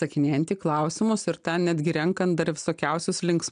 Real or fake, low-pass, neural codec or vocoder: real; 9.9 kHz; none